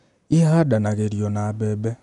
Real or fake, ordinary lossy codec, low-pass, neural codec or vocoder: real; none; 10.8 kHz; none